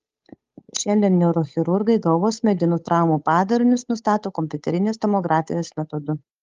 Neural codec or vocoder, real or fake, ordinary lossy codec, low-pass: codec, 16 kHz, 8 kbps, FunCodec, trained on Chinese and English, 25 frames a second; fake; Opus, 32 kbps; 7.2 kHz